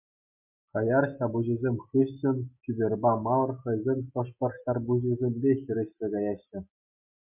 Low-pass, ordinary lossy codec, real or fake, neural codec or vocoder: 3.6 kHz; MP3, 32 kbps; real; none